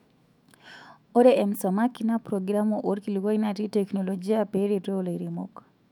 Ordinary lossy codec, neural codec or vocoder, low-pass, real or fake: none; autoencoder, 48 kHz, 128 numbers a frame, DAC-VAE, trained on Japanese speech; 19.8 kHz; fake